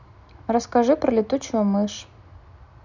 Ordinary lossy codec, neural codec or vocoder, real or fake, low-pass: none; none; real; 7.2 kHz